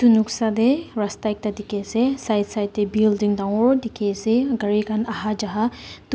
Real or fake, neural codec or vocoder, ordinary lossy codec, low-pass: real; none; none; none